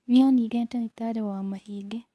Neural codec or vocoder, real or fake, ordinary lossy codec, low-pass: codec, 24 kHz, 0.9 kbps, WavTokenizer, medium speech release version 2; fake; none; none